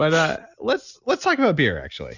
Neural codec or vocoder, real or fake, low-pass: none; real; 7.2 kHz